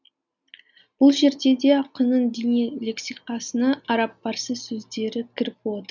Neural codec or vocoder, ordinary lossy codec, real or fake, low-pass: none; none; real; 7.2 kHz